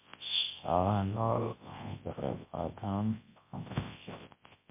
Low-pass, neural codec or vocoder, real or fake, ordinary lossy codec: 3.6 kHz; codec, 24 kHz, 0.9 kbps, WavTokenizer, large speech release; fake; MP3, 24 kbps